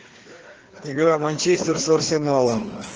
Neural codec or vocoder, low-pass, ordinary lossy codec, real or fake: codec, 16 kHz, 2 kbps, FreqCodec, larger model; 7.2 kHz; Opus, 16 kbps; fake